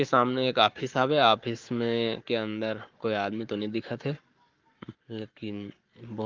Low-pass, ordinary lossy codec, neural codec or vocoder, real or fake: 7.2 kHz; Opus, 32 kbps; codec, 44.1 kHz, 7.8 kbps, Pupu-Codec; fake